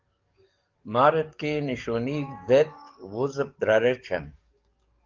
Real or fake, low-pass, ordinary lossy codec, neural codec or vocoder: fake; 7.2 kHz; Opus, 24 kbps; codec, 44.1 kHz, 7.8 kbps, DAC